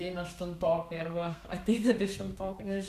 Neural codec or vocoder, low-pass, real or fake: codec, 32 kHz, 1.9 kbps, SNAC; 14.4 kHz; fake